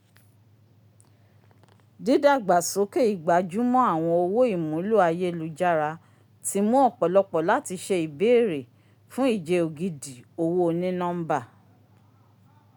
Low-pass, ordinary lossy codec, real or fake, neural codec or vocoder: none; none; real; none